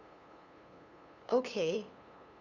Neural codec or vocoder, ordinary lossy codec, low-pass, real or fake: codec, 16 kHz, 2 kbps, FunCodec, trained on LibriTTS, 25 frames a second; none; 7.2 kHz; fake